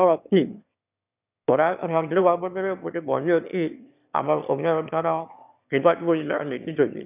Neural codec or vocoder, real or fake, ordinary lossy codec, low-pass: autoencoder, 22.05 kHz, a latent of 192 numbers a frame, VITS, trained on one speaker; fake; none; 3.6 kHz